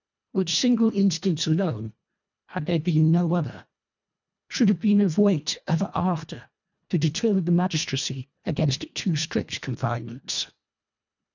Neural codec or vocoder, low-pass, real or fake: codec, 24 kHz, 1.5 kbps, HILCodec; 7.2 kHz; fake